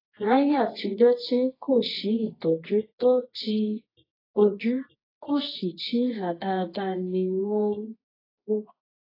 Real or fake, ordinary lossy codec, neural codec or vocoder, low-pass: fake; AAC, 24 kbps; codec, 24 kHz, 0.9 kbps, WavTokenizer, medium music audio release; 5.4 kHz